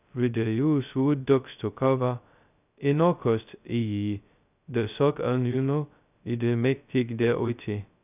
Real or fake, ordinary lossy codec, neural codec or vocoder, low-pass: fake; none; codec, 16 kHz, 0.2 kbps, FocalCodec; 3.6 kHz